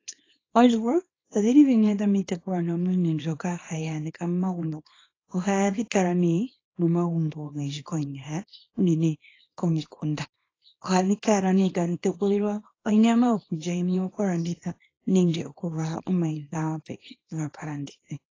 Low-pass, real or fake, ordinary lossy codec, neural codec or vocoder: 7.2 kHz; fake; AAC, 32 kbps; codec, 24 kHz, 0.9 kbps, WavTokenizer, small release